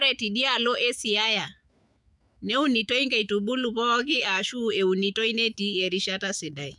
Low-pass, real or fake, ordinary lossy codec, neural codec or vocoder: 10.8 kHz; fake; none; autoencoder, 48 kHz, 128 numbers a frame, DAC-VAE, trained on Japanese speech